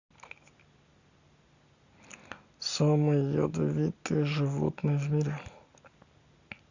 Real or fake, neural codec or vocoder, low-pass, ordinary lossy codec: real; none; 7.2 kHz; Opus, 64 kbps